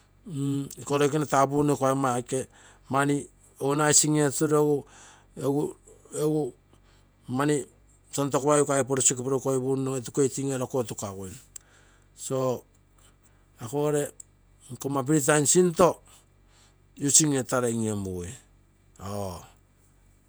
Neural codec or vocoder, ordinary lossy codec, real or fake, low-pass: vocoder, 48 kHz, 128 mel bands, Vocos; none; fake; none